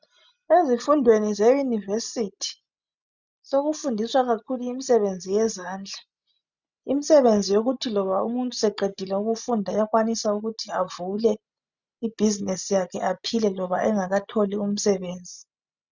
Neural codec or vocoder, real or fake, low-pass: none; real; 7.2 kHz